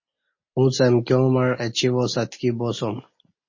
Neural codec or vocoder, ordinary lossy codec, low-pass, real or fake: none; MP3, 32 kbps; 7.2 kHz; real